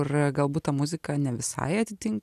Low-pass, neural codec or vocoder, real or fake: 14.4 kHz; none; real